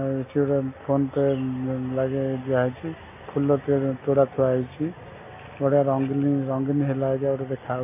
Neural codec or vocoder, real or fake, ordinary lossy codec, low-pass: none; real; AAC, 24 kbps; 3.6 kHz